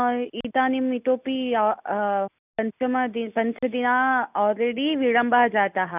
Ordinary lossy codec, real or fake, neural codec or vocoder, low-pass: none; real; none; 3.6 kHz